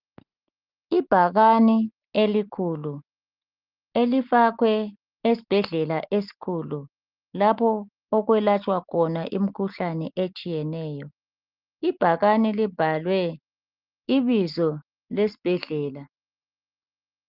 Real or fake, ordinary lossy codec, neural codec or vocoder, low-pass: real; Opus, 24 kbps; none; 5.4 kHz